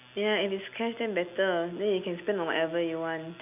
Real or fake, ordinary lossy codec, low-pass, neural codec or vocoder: real; none; 3.6 kHz; none